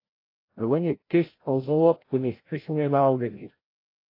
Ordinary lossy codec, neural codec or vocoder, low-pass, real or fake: AAC, 32 kbps; codec, 16 kHz, 0.5 kbps, FreqCodec, larger model; 5.4 kHz; fake